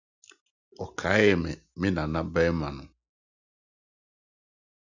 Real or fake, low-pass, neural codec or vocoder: real; 7.2 kHz; none